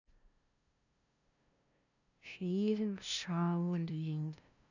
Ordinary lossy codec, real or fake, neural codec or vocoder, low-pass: none; fake; codec, 16 kHz, 0.5 kbps, FunCodec, trained on LibriTTS, 25 frames a second; 7.2 kHz